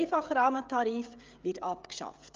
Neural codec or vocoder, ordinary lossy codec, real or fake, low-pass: codec, 16 kHz, 16 kbps, FreqCodec, smaller model; Opus, 24 kbps; fake; 7.2 kHz